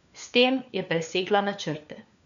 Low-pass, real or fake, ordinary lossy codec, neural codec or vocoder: 7.2 kHz; fake; none; codec, 16 kHz, 4 kbps, FunCodec, trained on LibriTTS, 50 frames a second